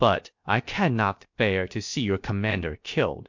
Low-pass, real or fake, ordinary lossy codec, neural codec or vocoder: 7.2 kHz; fake; MP3, 48 kbps; codec, 16 kHz, 0.7 kbps, FocalCodec